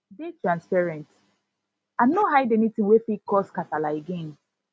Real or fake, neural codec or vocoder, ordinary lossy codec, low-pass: real; none; none; none